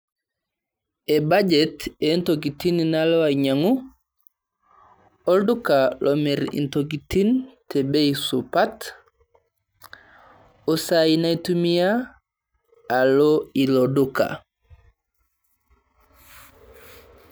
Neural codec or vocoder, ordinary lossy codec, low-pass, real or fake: none; none; none; real